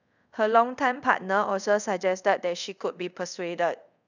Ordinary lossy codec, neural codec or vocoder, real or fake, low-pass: none; codec, 24 kHz, 0.5 kbps, DualCodec; fake; 7.2 kHz